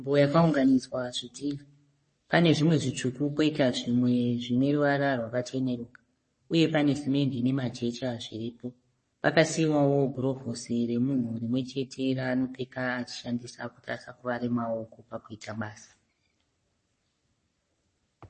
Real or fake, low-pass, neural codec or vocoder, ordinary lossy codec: fake; 10.8 kHz; codec, 44.1 kHz, 3.4 kbps, Pupu-Codec; MP3, 32 kbps